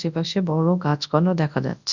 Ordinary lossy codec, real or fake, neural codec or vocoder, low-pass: none; fake; codec, 24 kHz, 0.9 kbps, WavTokenizer, large speech release; 7.2 kHz